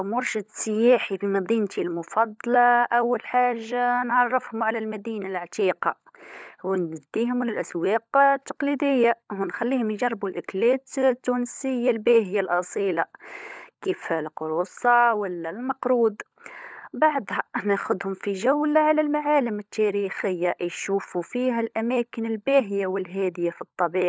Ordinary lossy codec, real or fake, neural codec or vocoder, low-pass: none; fake; codec, 16 kHz, 16 kbps, FunCodec, trained on LibriTTS, 50 frames a second; none